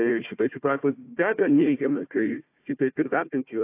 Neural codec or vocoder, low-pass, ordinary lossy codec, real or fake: codec, 16 kHz, 1 kbps, FunCodec, trained on Chinese and English, 50 frames a second; 3.6 kHz; AAC, 24 kbps; fake